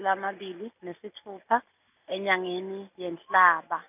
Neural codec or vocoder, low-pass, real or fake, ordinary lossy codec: none; 3.6 kHz; real; none